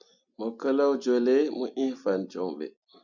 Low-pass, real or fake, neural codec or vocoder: 7.2 kHz; real; none